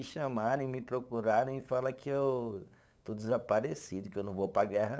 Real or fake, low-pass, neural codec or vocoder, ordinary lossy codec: fake; none; codec, 16 kHz, 8 kbps, FunCodec, trained on LibriTTS, 25 frames a second; none